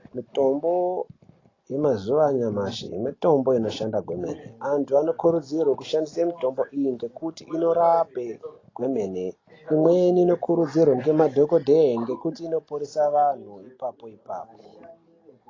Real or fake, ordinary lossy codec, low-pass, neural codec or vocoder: real; AAC, 32 kbps; 7.2 kHz; none